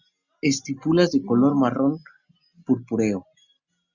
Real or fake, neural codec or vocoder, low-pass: real; none; 7.2 kHz